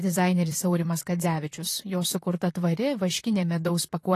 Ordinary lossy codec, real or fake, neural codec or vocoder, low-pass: AAC, 48 kbps; fake; vocoder, 44.1 kHz, 128 mel bands, Pupu-Vocoder; 14.4 kHz